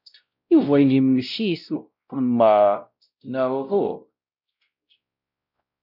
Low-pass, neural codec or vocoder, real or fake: 5.4 kHz; codec, 16 kHz, 0.5 kbps, X-Codec, WavLM features, trained on Multilingual LibriSpeech; fake